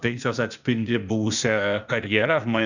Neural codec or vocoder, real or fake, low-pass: codec, 16 kHz, 0.8 kbps, ZipCodec; fake; 7.2 kHz